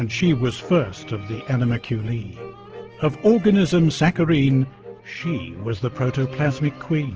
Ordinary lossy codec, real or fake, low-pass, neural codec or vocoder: Opus, 16 kbps; real; 7.2 kHz; none